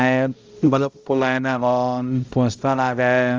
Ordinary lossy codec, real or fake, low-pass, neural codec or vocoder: Opus, 32 kbps; fake; 7.2 kHz; codec, 16 kHz, 0.5 kbps, X-Codec, HuBERT features, trained on balanced general audio